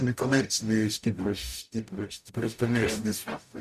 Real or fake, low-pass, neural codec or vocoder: fake; 14.4 kHz; codec, 44.1 kHz, 0.9 kbps, DAC